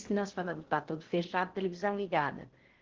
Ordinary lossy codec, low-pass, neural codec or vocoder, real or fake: Opus, 16 kbps; 7.2 kHz; codec, 16 kHz in and 24 kHz out, 0.6 kbps, FocalCodec, streaming, 2048 codes; fake